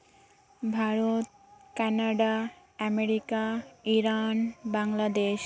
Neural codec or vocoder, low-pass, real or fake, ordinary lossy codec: none; none; real; none